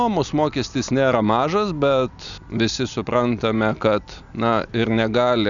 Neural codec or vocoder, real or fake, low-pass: none; real; 7.2 kHz